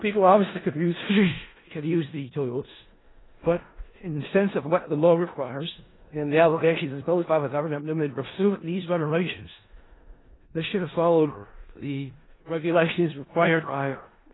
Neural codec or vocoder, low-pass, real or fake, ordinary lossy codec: codec, 16 kHz in and 24 kHz out, 0.4 kbps, LongCat-Audio-Codec, four codebook decoder; 7.2 kHz; fake; AAC, 16 kbps